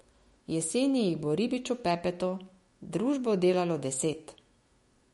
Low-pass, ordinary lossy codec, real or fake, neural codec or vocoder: 19.8 kHz; MP3, 48 kbps; fake; autoencoder, 48 kHz, 128 numbers a frame, DAC-VAE, trained on Japanese speech